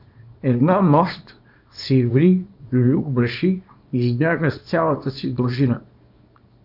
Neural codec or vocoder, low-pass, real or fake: codec, 24 kHz, 0.9 kbps, WavTokenizer, small release; 5.4 kHz; fake